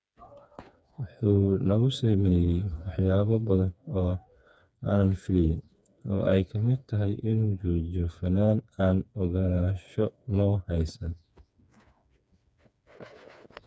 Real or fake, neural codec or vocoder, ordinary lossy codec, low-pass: fake; codec, 16 kHz, 4 kbps, FreqCodec, smaller model; none; none